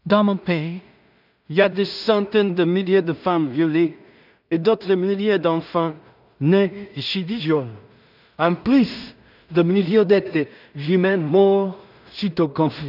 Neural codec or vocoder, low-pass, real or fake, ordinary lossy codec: codec, 16 kHz in and 24 kHz out, 0.4 kbps, LongCat-Audio-Codec, two codebook decoder; 5.4 kHz; fake; none